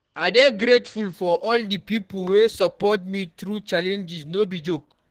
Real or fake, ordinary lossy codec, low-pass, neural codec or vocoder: fake; Opus, 16 kbps; 14.4 kHz; codec, 32 kHz, 1.9 kbps, SNAC